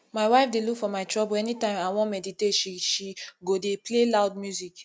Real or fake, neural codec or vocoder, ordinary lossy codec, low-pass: real; none; none; none